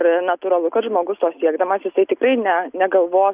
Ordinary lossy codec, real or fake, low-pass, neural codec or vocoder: Opus, 64 kbps; real; 3.6 kHz; none